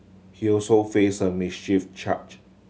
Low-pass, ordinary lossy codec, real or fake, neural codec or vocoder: none; none; real; none